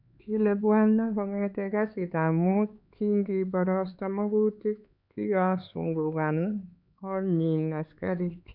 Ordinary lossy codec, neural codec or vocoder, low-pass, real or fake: none; codec, 16 kHz, 4 kbps, X-Codec, HuBERT features, trained on LibriSpeech; 5.4 kHz; fake